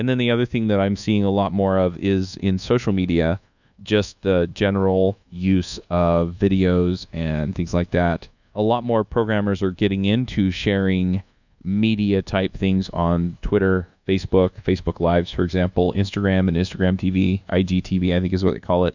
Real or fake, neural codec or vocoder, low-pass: fake; codec, 24 kHz, 1.2 kbps, DualCodec; 7.2 kHz